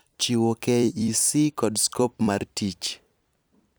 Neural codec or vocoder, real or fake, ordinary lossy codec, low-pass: vocoder, 44.1 kHz, 128 mel bands every 256 samples, BigVGAN v2; fake; none; none